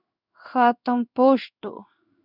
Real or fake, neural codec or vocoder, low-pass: fake; codec, 16 kHz in and 24 kHz out, 1 kbps, XY-Tokenizer; 5.4 kHz